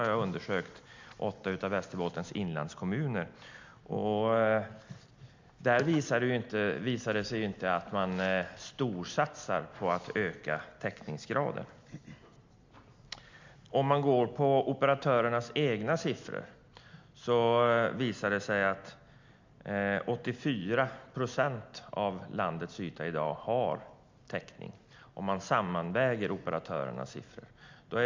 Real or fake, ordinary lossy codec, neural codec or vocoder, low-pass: real; MP3, 64 kbps; none; 7.2 kHz